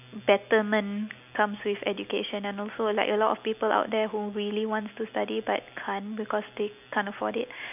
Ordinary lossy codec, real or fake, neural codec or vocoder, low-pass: none; real; none; 3.6 kHz